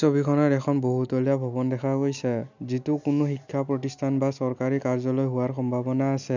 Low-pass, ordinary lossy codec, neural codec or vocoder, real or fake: 7.2 kHz; none; none; real